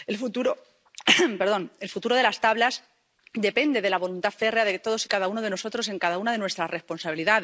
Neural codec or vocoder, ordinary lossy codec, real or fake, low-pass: none; none; real; none